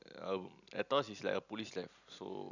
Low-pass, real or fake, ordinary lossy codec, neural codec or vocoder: 7.2 kHz; real; none; none